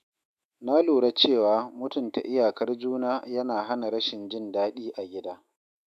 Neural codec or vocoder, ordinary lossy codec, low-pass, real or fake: autoencoder, 48 kHz, 128 numbers a frame, DAC-VAE, trained on Japanese speech; AAC, 96 kbps; 14.4 kHz; fake